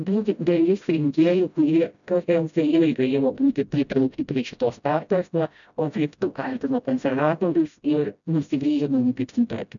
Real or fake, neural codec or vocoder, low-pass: fake; codec, 16 kHz, 0.5 kbps, FreqCodec, smaller model; 7.2 kHz